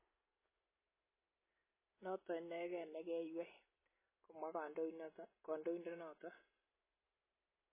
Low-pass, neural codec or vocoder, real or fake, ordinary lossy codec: 3.6 kHz; none; real; MP3, 16 kbps